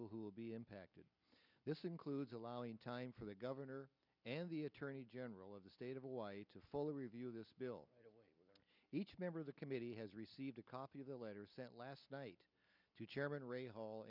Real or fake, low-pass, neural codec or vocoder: real; 5.4 kHz; none